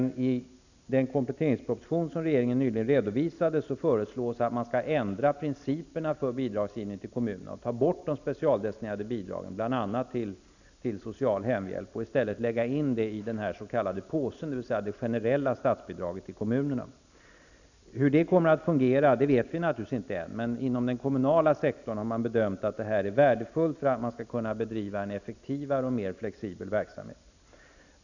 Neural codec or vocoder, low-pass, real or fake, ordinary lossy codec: none; 7.2 kHz; real; none